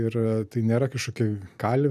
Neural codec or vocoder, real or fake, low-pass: none; real; 14.4 kHz